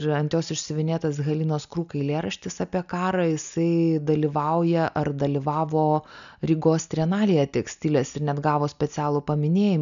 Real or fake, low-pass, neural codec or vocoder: real; 7.2 kHz; none